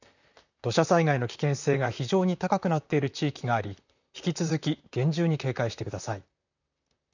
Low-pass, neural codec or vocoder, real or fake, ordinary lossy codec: 7.2 kHz; vocoder, 44.1 kHz, 128 mel bands, Pupu-Vocoder; fake; none